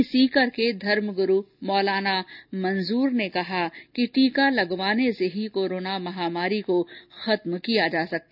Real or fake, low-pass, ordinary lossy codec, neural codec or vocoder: real; 5.4 kHz; none; none